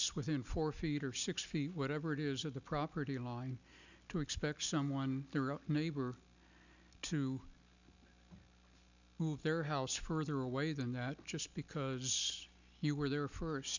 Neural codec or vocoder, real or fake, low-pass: none; real; 7.2 kHz